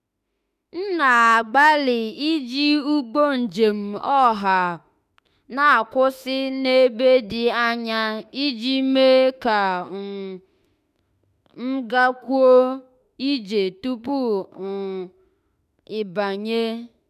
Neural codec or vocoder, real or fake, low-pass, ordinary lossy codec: autoencoder, 48 kHz, 32 numbers a frame, DAC-VAE, trained on Japanese speech; fake; 14.4 kHz; none